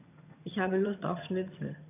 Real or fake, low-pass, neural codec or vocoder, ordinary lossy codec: fake; 3.6 kHz; vocoder, 22.05 kHz, 80 mel bands, HiFi-GAN; none